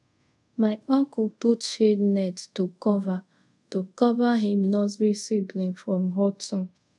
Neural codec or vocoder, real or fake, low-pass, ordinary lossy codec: codec, 24 kHz, 0.5 kbps, DualCodec; fake; 10.8 kHz; none